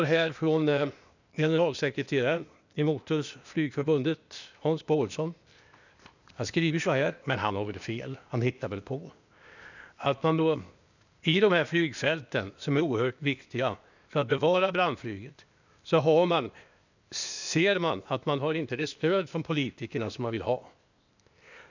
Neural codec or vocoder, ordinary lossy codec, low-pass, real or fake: codec, 16 kHz, 0.8 kbps, ZipCodec; none; 7.2 kHz; fake